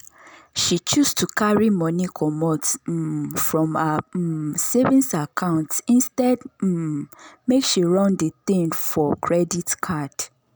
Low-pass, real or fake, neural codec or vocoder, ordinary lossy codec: none; real; none; none